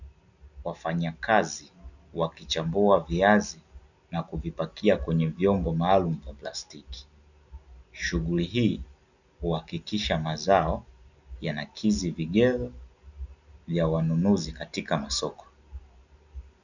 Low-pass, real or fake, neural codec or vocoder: 7.2 kHz; real; none